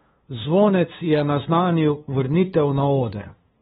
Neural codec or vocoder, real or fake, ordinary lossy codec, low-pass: codec, 16 kHz, 2 kbps, FunCodec, trained on LibriTTS, 25 frames a second; fake; AAC, 16 kbps; 7.2 kHz